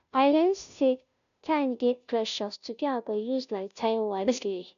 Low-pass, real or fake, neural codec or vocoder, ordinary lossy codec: 7.2 kHz; fake; codec, 16 kHz, 0.5 kbps, FunCodec, trained on Chinese and English, 25 frames a second; none